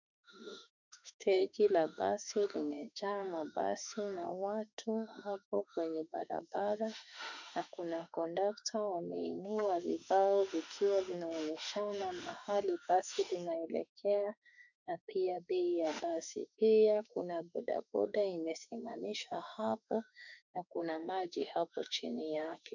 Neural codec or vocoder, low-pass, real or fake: autoencoder, 48 kHz, 32 numbers a frame, DAC-VAE, trained on Japanese speech; 7.2 kHz; fake